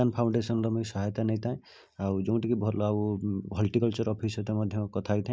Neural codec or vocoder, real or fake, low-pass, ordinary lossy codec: none; real; none; none